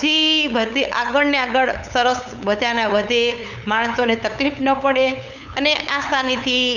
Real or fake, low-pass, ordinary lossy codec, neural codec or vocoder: fake; 7.2 kHz; none; codec, 16 kHz, 8 kbps, FunCodec, trained on LibriTTS, 25 frames a second